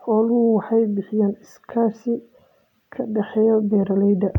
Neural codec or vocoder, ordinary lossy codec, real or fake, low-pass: none; none; real; 19.8 kHz